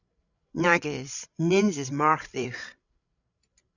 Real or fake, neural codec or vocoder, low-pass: fake; codec, 16 kHz, 16 kbps, FreqCodec, larger model; 7.2 kHz